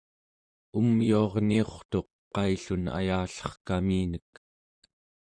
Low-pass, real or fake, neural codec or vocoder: 9.9 kHz; fake; vocoder, 44.1 kHz, 128 mel bands, Pupu-Vocoder